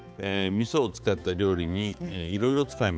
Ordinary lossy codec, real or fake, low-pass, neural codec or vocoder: none; fake; none; codec, 16 kHz, 4 kbps, X-Codec, HuBERT features, trained on balanced general audio